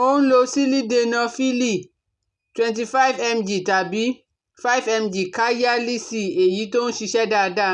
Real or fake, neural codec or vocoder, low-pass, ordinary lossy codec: real; none; 10.8 kHz; none